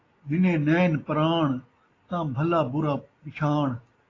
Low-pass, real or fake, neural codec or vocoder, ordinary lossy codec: 7.2 kHz; real; none; AAC, 32 kbps